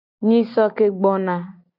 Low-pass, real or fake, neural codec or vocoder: 5.4 kHz; real; none